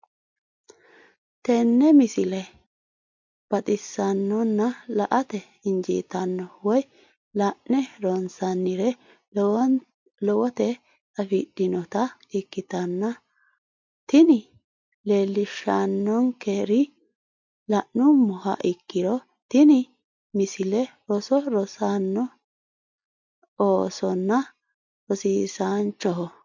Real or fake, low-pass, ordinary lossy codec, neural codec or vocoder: real; 7.2 kHz; MP3, 48 kbps; none